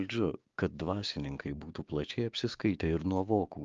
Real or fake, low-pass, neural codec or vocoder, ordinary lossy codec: fake; 7.2 kHz; codec, 16 kHz, 6 kbps, DAC; Opus, 32 kbps